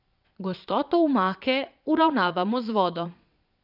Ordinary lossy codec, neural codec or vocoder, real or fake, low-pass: none; vocoder, 22.05 kHz, 80 mel bands, Vocos; fake; 5.4 kHz